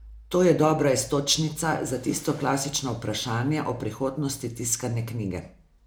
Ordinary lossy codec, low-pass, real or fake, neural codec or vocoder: none; none; real; none